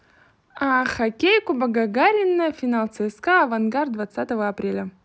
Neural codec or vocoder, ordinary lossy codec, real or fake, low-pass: none; none; real; none